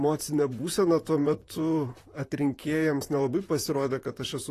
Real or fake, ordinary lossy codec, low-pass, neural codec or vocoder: fake; AAC, 48 kbps; 14.4 kHz; vocoder, 44.1 kHz, 128 mel bands, Pupu-Vocoder